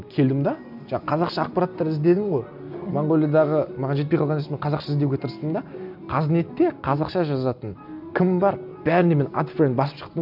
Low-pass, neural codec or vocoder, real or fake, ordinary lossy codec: 5.4 kHz; none; real; none